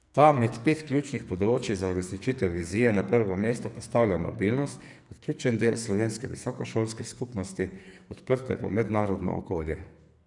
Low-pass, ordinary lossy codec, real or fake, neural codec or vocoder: 10.8 kHz; none; fake; codec, 44.1 kHz, 2.6 kbps, SNAC